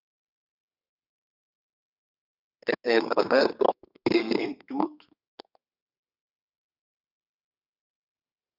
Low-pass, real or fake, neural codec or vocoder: 5.4 kHz; fake; codec, 32 kHz, 1.9 kbps, SNAC